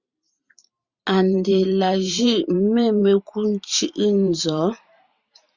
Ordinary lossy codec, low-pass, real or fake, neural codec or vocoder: Opus, 64 kbps; 7.2 kHz; fake; vocoder, 44.1 kHz, 128 mel bands every 512 samples, BigVGAN v2